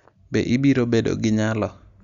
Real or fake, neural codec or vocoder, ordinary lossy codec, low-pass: real; none; Opus, 64 kbps; 7.2 kHz